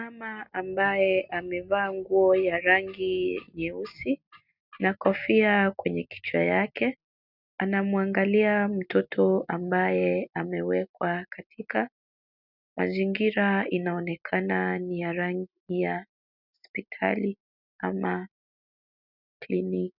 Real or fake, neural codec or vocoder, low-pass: real; none; 5.4 kHz